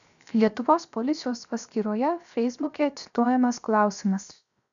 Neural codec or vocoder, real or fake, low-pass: codec, 16 kHz, 0.7 kbps, FocalCodec; fake; 7.2 kHz